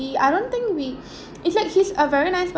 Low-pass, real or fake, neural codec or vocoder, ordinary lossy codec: none; real; none; none